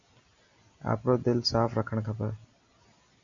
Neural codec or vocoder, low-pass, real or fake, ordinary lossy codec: none; 7.2 kHz; real; Opus, 64 kbps